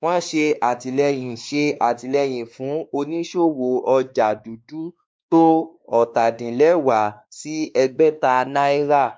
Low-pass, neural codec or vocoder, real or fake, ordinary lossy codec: none; codec, 16 kHz, 2 kbps, X-Codec, WavLM features, trained on Multilingual LibriSpeech; fake; none